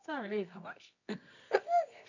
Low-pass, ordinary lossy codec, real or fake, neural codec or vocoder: 7.2 kHz; none; fake; codec, 24 kHz, 0.9 kbps, WavTokenizer, medium music audio release